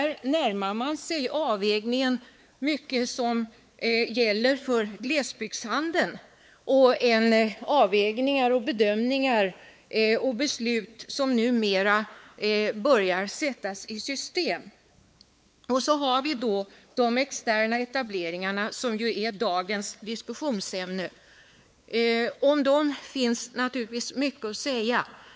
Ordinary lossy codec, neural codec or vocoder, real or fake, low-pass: none; codec, 16 kHz, 4 kbps, X-Codec, WavLM features, trained on Multilingual LibriSpeech; fake; none